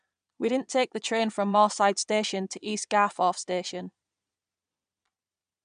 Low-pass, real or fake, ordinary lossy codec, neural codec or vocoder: 9.9 kHz; fake; none; vocoder, 22.05 kHz, 80 mel bands, Vocos